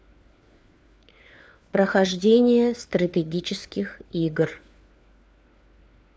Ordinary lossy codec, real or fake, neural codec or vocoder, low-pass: none; fake; codec, 16 kHz, 4 kbps, FunCodec, trained on LibriTTS, 50 frames a second; none